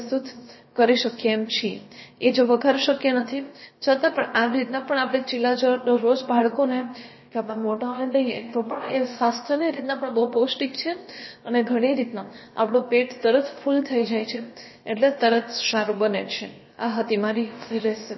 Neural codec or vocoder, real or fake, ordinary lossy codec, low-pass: codec, 16 kHz, about 1 kbps, DyCAST, with the encoder's durations; fake; MP3, 24 kbps; 7.2 kHz